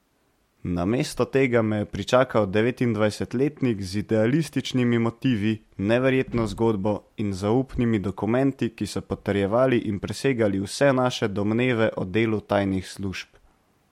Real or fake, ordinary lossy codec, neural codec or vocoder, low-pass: real; MP3, 64 kbps; none; 19.8 kHz